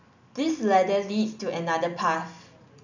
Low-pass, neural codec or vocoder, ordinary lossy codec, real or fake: 7.2 kHz; none; none; real